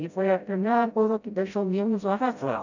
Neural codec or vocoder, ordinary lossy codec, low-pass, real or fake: codec, 16 kHz, 0.5 kbps, FreqCodec, smaller model; none; 7.2 kHz; fake